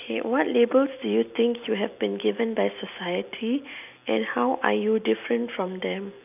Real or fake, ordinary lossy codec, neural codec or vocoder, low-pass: real; none; none; 3.6 kHz